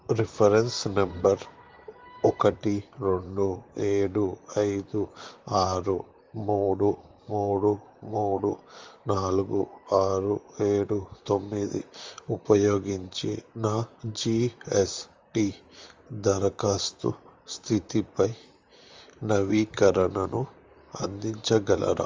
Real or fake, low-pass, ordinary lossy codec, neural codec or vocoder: fake; 7.2 kHz; Opus, 32 kbps; vocoder, 44.1 kHz, 128 mel bands, Pupu-Vocoder